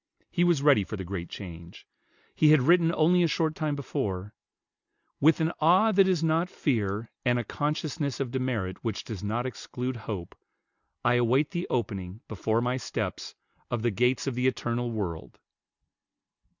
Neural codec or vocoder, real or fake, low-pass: none; real; 7.2 kHz